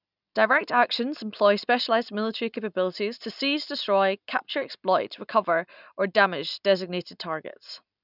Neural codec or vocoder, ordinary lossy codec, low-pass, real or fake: none; none; 5.4 kHz; real